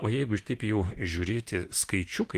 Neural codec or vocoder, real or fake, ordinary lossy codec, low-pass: autoencoder, 48 kHz, 32 numbers a frame, DAC-VAE, trained on Japanese speech; fake; Opus, 24 kbps; 14.4 kHz